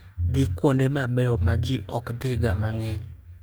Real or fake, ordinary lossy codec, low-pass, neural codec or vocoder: fake; none; none; codec, 44.1 kHz, 2.6 kbps, DAC